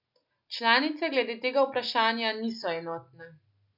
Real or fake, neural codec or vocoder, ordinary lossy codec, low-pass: real; none; none; 5.4 kHz